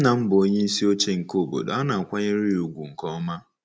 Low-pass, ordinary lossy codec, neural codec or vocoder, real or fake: none; none; none; real